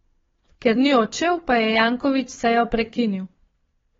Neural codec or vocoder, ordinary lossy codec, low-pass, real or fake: codec, 16 kHz, 4 kbps, FunCodec, trained on Chinese and English, 50 frames a second; AAC, 24 kbps; 7.2 kHz; fake